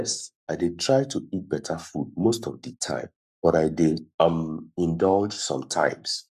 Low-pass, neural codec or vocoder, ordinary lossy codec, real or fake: 14.4 kHz; codec, 44.1 kHz, 7.8 kbps, Pupu-Codec; none; fake